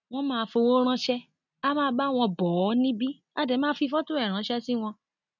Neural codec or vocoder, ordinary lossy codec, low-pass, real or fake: none; none; 7.2 kHz; real